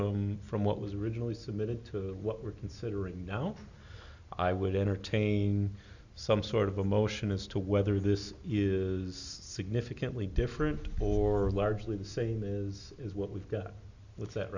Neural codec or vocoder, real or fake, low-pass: none; real; 7.2 kHz